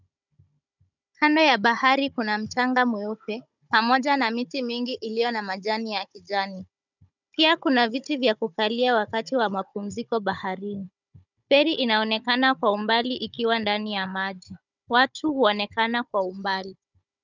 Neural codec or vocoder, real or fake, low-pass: codec, 16 kHz, 16 kbps, FunCodec, trained on Chinese and English, 50 frames a second; fake; 7.2 kHz